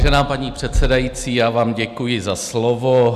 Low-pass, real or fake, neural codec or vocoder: 14.4 kHz; real; none